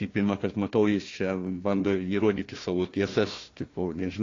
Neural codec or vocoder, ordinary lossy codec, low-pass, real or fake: codec, 16 kHz, 1 kbps, FunCodec, trained on Chinese and English, 50 frames a second; AAC, 32 kbps; 7.2 kHz; fake